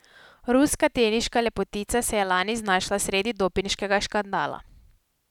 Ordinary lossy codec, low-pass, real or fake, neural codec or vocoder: none; 19.8 kHz; real; none